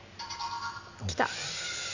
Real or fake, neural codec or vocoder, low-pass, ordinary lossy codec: real; none; 7.2 kHz; none